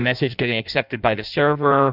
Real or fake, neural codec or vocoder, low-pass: fake; codec, 16 kHz in and 24 kHz out, 0.6 kbps, FireRedTTS-2 codec; 5.4 kHz